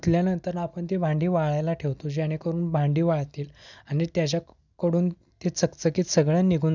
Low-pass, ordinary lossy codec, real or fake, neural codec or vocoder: 7.2 kHz; none; real; none